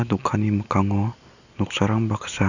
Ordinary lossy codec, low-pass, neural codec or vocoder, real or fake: none; 7.2 kHz; none; real